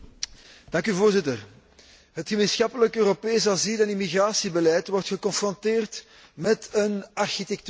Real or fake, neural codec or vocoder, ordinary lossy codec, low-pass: real; none; none; none